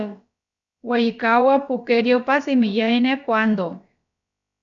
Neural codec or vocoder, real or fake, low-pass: codec, 16 kHz, about 1 kbps, DyCAST, with the encoder's durations; fake; 7.2 kHz